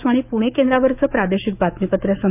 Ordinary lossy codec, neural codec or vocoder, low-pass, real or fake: none; autoencoder, 48 kHz, 128 numbers a frame, DAC-VAE, trained on Japanese speech; 3.6 kHz; fake